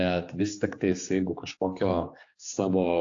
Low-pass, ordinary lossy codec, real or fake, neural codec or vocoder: 7.2 kHz; AAC, 48 kbps; fake; codec, 16 kHz, 4 kbps, X-Codec, HuBERT features, trained on general audio